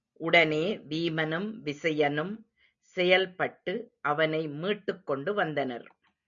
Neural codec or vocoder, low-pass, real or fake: none; 7.2 kHz; real